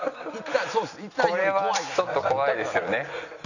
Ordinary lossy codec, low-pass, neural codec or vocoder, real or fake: none; 7.2 kHz; none; real